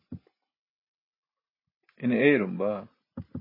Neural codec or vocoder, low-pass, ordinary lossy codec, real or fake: none; 5.4 kHz; MP3, 24 kbps; real